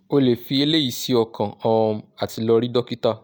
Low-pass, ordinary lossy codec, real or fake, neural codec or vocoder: none; none; real; none